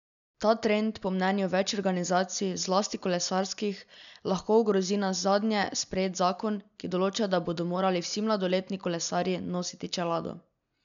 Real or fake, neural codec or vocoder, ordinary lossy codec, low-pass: real; none; none; 7.2 kHz